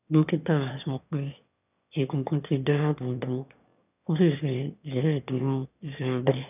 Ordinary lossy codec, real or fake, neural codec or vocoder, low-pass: none; fake; autoencoder, 22.05 kHz, a latent of 192 numbers a frame, VITS, trained on one speaker; 3.6 kHz